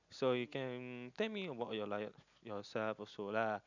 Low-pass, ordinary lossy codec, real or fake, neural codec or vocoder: 7.2 kHz; none; real; none